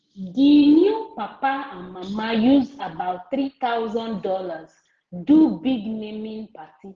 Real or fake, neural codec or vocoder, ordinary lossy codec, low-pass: real; none; Opus, 16 kbps; 7.2 kHz